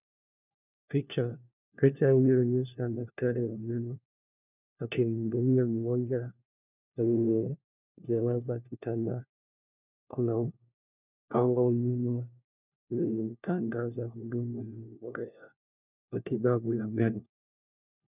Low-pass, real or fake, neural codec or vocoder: 3.6 kHz; fake; codec, 16 kHz, 1 kbps, FunCodec, trained on LibriTTS, 50 frames a second